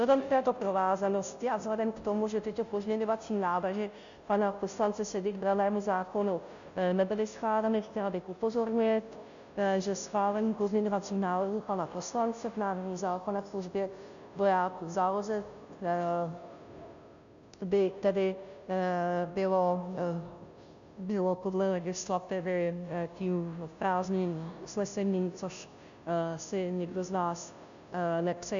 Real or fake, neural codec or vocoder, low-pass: fake; codec, 16 kHz, 0.5 kbps, FunCodec, trained on Chinese and English, 25 frames a second; 7.2 kHz